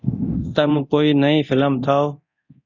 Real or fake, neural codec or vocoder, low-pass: fake; codec, 24 kHz, 0.9 kbps, DualCodec; 7.2 kHz